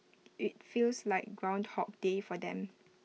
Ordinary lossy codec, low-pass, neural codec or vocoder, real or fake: none; none; none; real